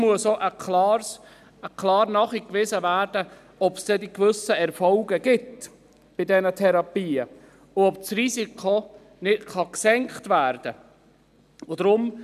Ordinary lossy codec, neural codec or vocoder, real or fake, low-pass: none; none; real; 14.4 kHz